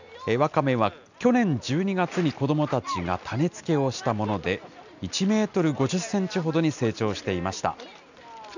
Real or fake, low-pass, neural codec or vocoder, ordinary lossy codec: real; 7.2 kHz; none; none